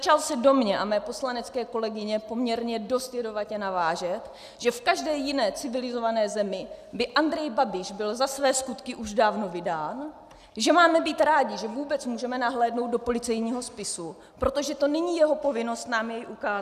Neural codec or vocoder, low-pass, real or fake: none; 14.4 kHz; real